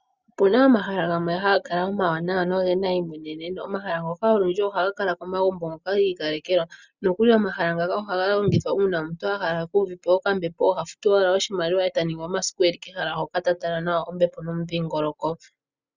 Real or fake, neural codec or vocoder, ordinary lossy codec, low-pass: real; none; Opus, 64 kbps; 7.2 kHz